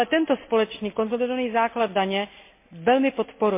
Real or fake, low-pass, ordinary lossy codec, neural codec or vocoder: real; 3.6 kHz; MP3, 24 kbps; none